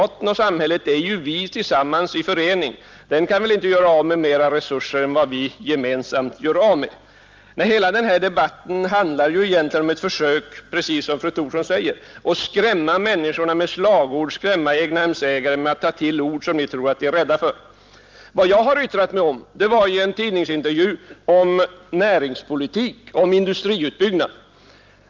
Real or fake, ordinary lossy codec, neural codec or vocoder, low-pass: real; Opus, 32 kbps; none; 7.2 kHz